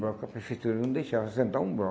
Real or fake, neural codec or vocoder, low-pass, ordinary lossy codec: real; none; none; none